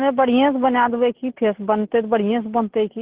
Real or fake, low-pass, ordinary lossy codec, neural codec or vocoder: real; 3.6 kHz; Opus, 16 kbps; none